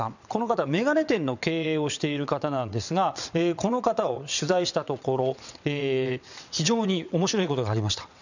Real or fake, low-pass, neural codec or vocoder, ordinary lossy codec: fake; 7.2 kHz; vocoder, 22.05 kHz, 80 mel bands, WaveNeXt; none